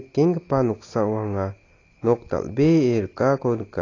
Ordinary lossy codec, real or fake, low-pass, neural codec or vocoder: AAC, 32 kbps; real; 7.2 kHz; none